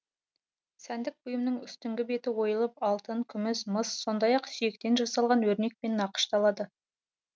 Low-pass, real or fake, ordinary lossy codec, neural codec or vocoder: none; real; none; none